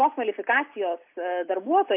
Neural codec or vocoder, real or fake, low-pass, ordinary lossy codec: none; real; 3.6 kHz; MP3, 32 kbps